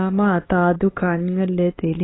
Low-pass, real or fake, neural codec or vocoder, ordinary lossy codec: 7.2 kHz; fake; codec, 16 kHz, 4 kbps, X-Codec, HuBERT features, trained on LibriSpeech; AAC, 16 kbps